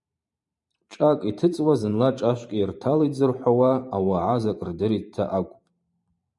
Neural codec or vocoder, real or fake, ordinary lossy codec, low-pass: vocoder, 44.1 kHz, 128 mel bands every 512 samples, BigVGAN v2; fake; MP3, 96 kbps; 10.8 kHz